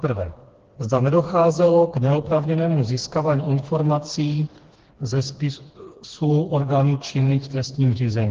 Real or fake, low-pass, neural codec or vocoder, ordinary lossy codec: fake; 7.2 kHz; codec, 16 kHz, 2 kbps, FreqCodec, smaller model; Opus, 16 kbps